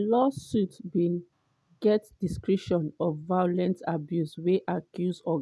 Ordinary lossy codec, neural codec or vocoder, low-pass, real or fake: none; none; none; real